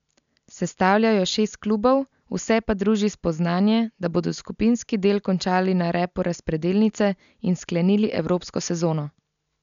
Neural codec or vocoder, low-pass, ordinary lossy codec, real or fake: none; 7.2 kHz; MP3, 96 kbps; real